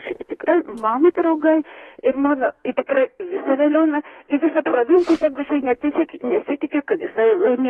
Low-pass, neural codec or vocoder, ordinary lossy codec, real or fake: 14.4 kHz; codec, 32 kHz, 1.9 kbps, SNAC; AAC, 32 kbps; fake